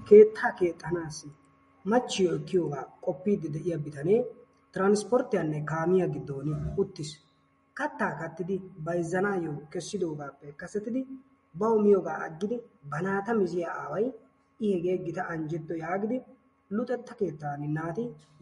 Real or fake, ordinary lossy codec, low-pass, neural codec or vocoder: real; MP3, 48 kbps; 19.8 kHz; none